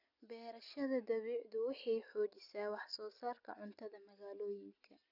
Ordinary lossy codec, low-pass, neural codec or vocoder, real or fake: none; 5.4 kHz; none; real